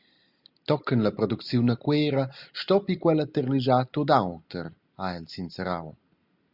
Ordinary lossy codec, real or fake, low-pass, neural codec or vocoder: Opus, 64 kbps; real; 5.4 kHz; none